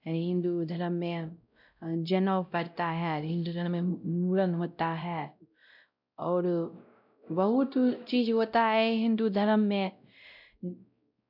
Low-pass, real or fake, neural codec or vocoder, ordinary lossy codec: 5.4 kHz; fake; codec, 16 kHz, 0.5 kbps, X-Codec, WavLM features, trained on Multilingual LibriSpeech; none